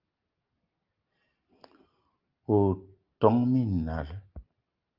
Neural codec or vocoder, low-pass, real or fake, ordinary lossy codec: none; 5.4 kHz; real; Opus, 24 kbps